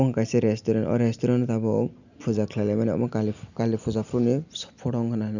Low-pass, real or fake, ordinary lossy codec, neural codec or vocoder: 7.2 kHz; real; none; none